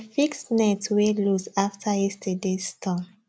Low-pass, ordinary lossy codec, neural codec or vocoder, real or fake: none; none; none; real